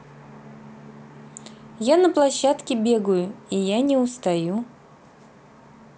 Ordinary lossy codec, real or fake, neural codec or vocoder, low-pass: none; real; none; none